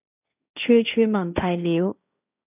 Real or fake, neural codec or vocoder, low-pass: fake; codec, 16 kHz, 1.1 kbps, Voila-Tokenizer; 3.6 kHz